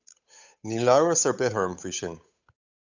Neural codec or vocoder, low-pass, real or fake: codec, 16 kHz, 8 kbps, FunCodec, trained on Chinese and English, 25 frames a second; 7.2 kHz; fake